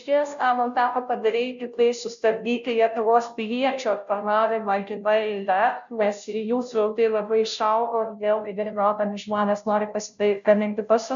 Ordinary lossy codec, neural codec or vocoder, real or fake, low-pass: AAC, 96 kbps; codec, 16 kHz, 0.5 kbps, FunCodec, trained on Chinese and English, 25 frames a second; fake; 7.2 kHz